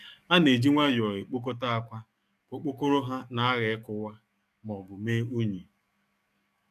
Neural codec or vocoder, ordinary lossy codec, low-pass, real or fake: codec, 44.1 kHz, 7.8 kbps, DAC; none; 14.4 kHz; fake